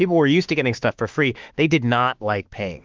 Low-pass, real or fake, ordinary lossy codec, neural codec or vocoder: 7.2 kHz; fake; Opus, 32 kbps; autoencoder, 48 kHz, 32 numbers a frame, DAC-VAE, trained on Japanese speech